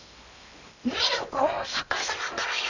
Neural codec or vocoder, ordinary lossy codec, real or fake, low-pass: codec, 16 kHz in and 24 kHz out, 0.8 kbps, FocalCodec, streaming, 65536 codes; none; fake; 7.2 kHz